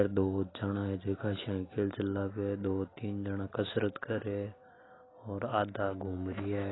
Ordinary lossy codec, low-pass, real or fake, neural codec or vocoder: AAC, 16 kbps; 7.2 kHz; real; none